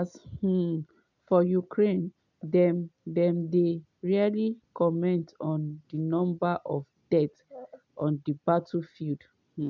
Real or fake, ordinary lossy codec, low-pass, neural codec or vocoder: real; none; 7.2 kHz; none